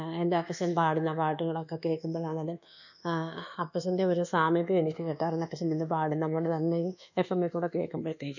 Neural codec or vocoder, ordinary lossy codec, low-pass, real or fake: codec, 24 kHz, 1.2 kbps, DualCodec; none; 7.2 kHz; fake